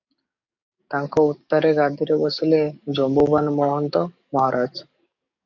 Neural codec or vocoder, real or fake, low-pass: codec, 44.1 kHz, 7.8 kbps, DAC; fake; 7.2 kHz